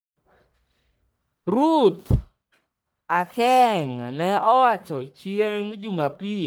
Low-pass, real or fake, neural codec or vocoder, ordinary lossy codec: none; fake; codec, 44.1 kHz, 1.7 kbps, Pupu-Codec; none